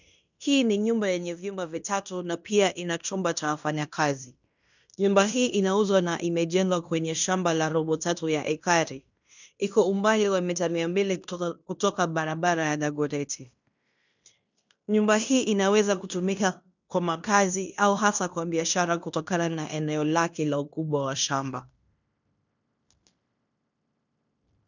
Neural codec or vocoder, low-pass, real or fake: codec, 16 kHz in and 24 kHz out, 0.9 kbps, LongCat-Audio-Codec, fine tuned four codebook decoder; 7.2 kHz; fake